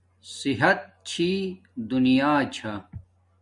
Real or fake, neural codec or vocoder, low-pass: real; none; 10.8 kHz